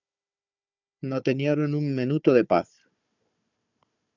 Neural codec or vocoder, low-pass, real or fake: codec, 16 kHz, 4 kbps, FunCodec, trained on Chinese and English, 50 frames a second; 7.2 kHz; fake